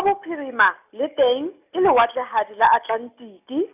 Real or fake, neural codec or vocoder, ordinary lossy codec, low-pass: real; none; none; 3.6 kHz